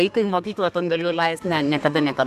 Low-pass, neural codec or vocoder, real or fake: 14.4 kHz; codec, 32 kHz, 1.9 kbps, SNAC; fake